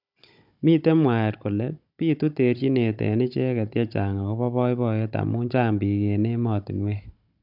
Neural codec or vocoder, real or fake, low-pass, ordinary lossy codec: codec, 16 kHz, 16 kbps, FunCodec, trained on Chinese and English, 50 frames a second; fake; 5.4 kHz; none